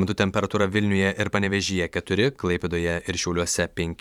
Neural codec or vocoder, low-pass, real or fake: vocoder, 44.1 kHz, 128 mel bands every 512 samples, BigVGAN v2; 19.8 kHz; fake